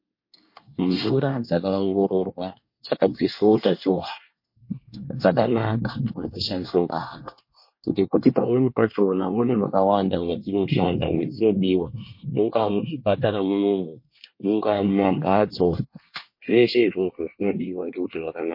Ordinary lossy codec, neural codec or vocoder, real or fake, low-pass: MP3, 32 kbps; codec, 24 kHz, 1 kbps, SNAC; fake; 5.4 kHz